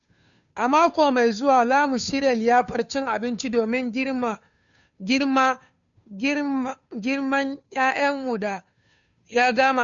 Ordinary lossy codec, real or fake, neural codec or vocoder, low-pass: none; fake; codec, 16 kHz, 2 kbps, FunCodec, trained on Chinese and English, 25 frames a second; 7.2 kHz